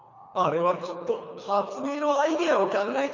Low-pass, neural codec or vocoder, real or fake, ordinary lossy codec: 7.2 kHz; codec, 24 kHz, 3 kbps, HILCodec; fake; none